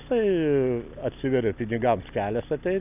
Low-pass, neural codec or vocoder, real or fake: 3.6 kHz; none; real